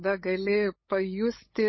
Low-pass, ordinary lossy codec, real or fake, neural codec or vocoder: 7.2 kHz; MP3, 24 kbps; fake; codec, 16 kHz, 8 kbps, FunCodec, trained on LibriTTS, 25 frames a second